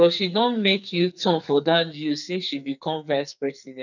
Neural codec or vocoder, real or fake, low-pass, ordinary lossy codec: codec, 44.1 kHz, 2.6 kbps, SNAC; fake; 7.2 kHz; none